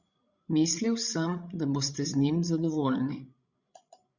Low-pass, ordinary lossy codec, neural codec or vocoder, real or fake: 7.2 kHz; Opus, 64 kbps; codec, 16 kHz, 16 kbps, FreqCodec, larger model; fake